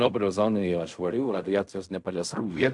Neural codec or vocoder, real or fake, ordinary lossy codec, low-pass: codec, 16 kHz in and 24 kHz out, 0.4 kbps, LongCat-Audio-Codec, fine tuned four codebook decoder; fake; AAC, 64 kbps; 10.8 kHz